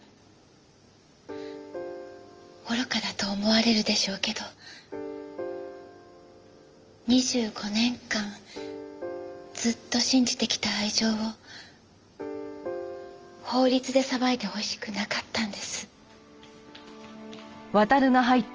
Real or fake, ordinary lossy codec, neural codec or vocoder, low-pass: real; Opus, 24 kbps; none; 7.2 kHz